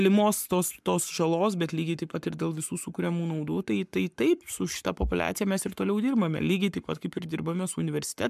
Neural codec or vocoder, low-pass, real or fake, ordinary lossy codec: codec, 44.1 kHz, 7.8 kbps, Pupu-Codec; 14.4 kHz; fake; MP3, 96 kbps